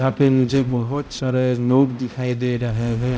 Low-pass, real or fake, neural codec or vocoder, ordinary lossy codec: none; fake; codec, 16 kHz, 0.5 kbps, X-Codec, HuBERT features, trained on balanced general audio; none